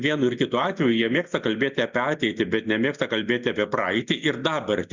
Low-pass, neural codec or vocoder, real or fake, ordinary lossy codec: 7.2 kHz; none; real; Opus, 64 kbps